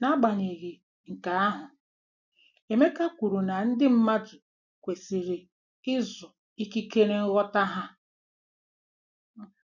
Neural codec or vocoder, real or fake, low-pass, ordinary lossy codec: none; real; 7.2 kHz; none